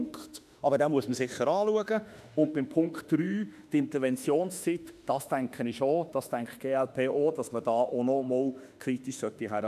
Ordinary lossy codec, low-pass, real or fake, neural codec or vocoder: none; 14.4 kHz; fake; autoencoder, 48 kHz, 32 numbers a frame, DAC-VAE, trained on Japanese speech